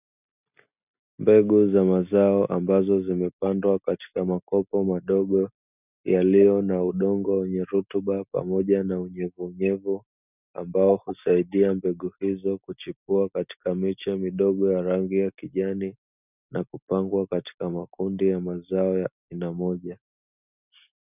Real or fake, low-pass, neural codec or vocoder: real; 3.6 kHz; none